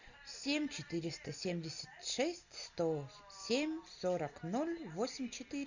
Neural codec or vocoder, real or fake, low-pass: none; real; 7.2 kHz